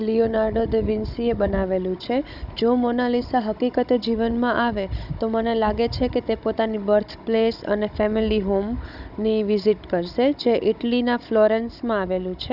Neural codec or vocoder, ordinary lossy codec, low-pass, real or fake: codec, 16 kHz, 16 kbps, FunCodec, trained on Chinese and English, 50 frames a second; none; 5.4 kHz; fake